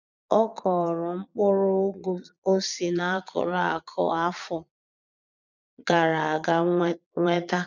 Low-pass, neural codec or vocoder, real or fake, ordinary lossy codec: 7.2 kHz; vocoder, 22.05 kHz, 80 mel bands, Vocos; fake; none